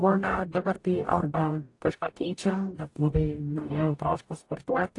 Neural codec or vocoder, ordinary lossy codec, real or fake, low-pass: codec, 44.1 kHz, 0.9 kbps, DAC; AAC, 48 kbps; fake; 10.8 kHz